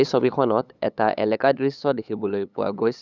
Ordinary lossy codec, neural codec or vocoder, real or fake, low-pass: none; codec, 16 kHz, 8 kbps, FunCodec, trained on LibriTTS, 25 frames a second; fake; 7.2 kHz